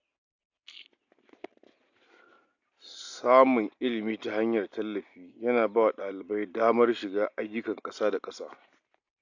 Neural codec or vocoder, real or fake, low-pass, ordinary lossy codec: none; real; 7.2 kHz; AAC, 48 kbps